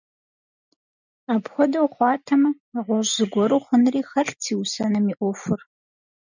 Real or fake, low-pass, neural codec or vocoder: real; 7.2 kHz; none